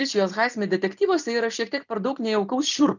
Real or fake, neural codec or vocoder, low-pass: real; none; 7.2 kHz